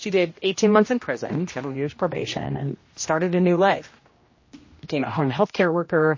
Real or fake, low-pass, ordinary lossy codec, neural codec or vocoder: fake; 7.2 kHz; MP3, 32 kbps; codec, 16 kHz, 1 kbps, X-Codec, HuBERT features, trained on general audio